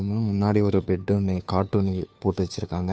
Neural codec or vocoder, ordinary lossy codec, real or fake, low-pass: codec, 16 kHz, 2 kbps, FunCodec, trained on Chinese and English, 25 frames a second; none; fake; none